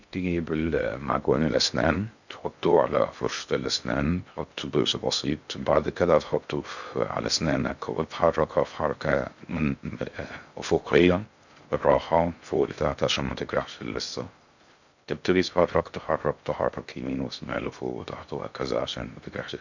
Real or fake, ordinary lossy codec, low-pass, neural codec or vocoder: fake; none; 7.2 kHz; codec, 16 kHz in and 24 kHz out, 0.6 kbps, FocalCodec, streaming, 2048 codes